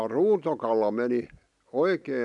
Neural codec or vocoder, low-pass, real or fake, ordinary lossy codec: none; 10.8 kHz; real; none